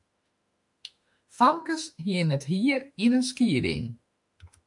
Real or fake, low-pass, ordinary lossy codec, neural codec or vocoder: fake; 10.8 kHz; MP3, 64 kbps; autoencoder, 48 kHz, 32 numbers a frame, DAC-VAE, trained on Japanese speech